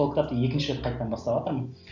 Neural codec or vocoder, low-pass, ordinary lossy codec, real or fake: none; 7.2 kHz; none; real